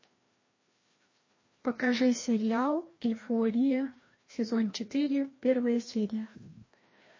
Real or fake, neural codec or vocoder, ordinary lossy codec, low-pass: fake; codec, 16 kHz, 1 kbps, FreqCodec, larger model; MP3, 32 kbps; 7.2 kHz